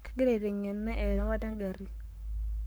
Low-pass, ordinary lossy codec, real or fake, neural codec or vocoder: none; none; fake; codec, 44.1 kHz, 7.8 kbps, Pupu-Codec